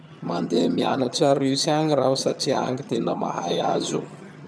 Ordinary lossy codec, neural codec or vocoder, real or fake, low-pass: none; vocoder, 22.05 kHz, 80 mel bands, HiFi-GAN; fake; none